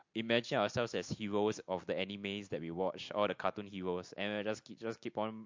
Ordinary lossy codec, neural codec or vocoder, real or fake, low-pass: MP3, 48 kbps; none; real; 7.2 kHz